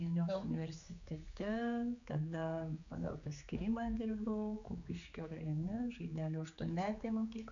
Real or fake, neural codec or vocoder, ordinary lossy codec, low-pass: fake; codec, 16 kHz, 4 kbps, X-Codec, HuBERT features, trained on general audio; MP3, 64 kbps; 7.2 kHz